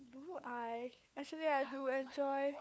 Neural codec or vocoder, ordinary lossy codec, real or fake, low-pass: codec, 16 kHz, 4 kbps, FunCodec, trained on LibriTTS, 50 frames a second; none; fake; none